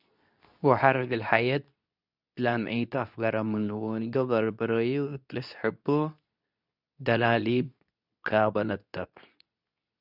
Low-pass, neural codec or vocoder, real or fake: 5.4 kHz; codec, 24 kHz, 0.9 kbps, WavTokenizer, medium speech release version 2; fake